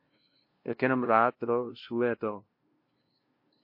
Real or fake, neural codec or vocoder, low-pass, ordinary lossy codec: fake; codec, 16 kHz, 0.5 kbps, FunCodec, trained on LibriTTS, 25 frames a second; 5.4 kHz; MP3, 32 kbps